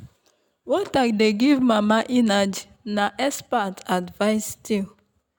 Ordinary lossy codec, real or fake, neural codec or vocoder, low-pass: none; real; none; none